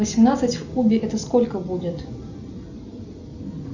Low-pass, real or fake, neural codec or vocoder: 7.2 kHz; real; none